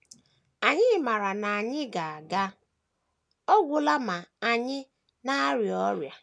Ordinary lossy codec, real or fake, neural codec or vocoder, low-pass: none; real; none; none